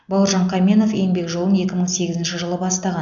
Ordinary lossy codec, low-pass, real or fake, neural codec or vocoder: none; 7.2 kHz; real; none